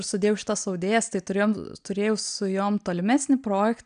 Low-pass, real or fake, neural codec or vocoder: 9.9 kHz; real; none